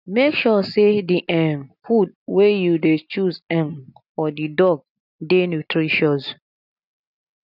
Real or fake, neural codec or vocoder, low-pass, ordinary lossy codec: real; none; 5.4 kHz; none